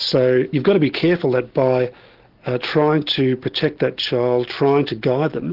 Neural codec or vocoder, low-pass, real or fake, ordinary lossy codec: none; 5.4 kHz; real; Opus, 16 kbps